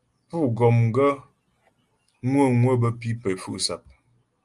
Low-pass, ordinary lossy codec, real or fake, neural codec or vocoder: 10.8 kHz; Opus, 32 kbps; real; none